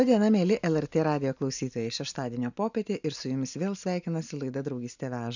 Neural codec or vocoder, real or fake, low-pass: none; real; 7.2 kHz